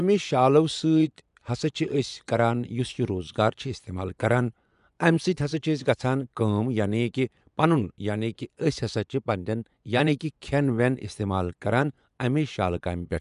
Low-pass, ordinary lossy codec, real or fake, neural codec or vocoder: 10.8 kHz; none; fake; vocoder, 24 kHz, 100 mel bands, Vocos